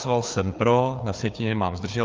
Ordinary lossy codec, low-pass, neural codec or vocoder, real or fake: Opus, 24 kbps; 7.2 kHz; codec, 16 kHz, 4 kbps, FreqCodec, larger model; fake